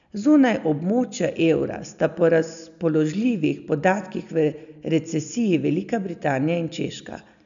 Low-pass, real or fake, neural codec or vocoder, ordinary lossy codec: 7.2 kHz; real; none; none